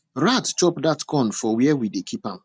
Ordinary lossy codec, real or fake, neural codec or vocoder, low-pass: none; real; none; none